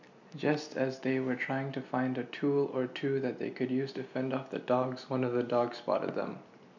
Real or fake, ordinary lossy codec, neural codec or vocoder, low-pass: real; none; none; 7.2 kHz